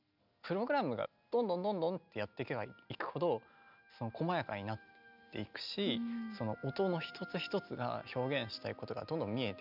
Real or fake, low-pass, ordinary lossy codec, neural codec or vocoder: real; 5.4 kHz; none; none